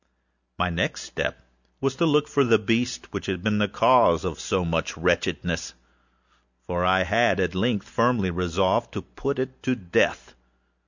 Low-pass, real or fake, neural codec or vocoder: 7.2 kHz; real; none